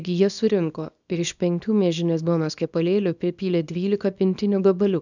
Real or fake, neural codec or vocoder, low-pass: fake; codec, 24 kHz, 0.9 kbps, WavTokenizer, small release; 7.2 kHz